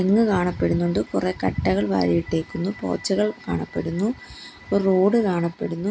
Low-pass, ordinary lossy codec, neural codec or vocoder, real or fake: none; none; none; real